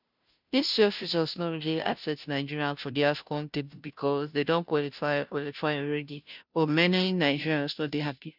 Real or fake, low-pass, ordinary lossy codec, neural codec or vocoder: fake; 5.4 kHz; none; codec, 16 kHz, 0.5 kbps, FunCodec, trained on Chinese and English, 25 frames a second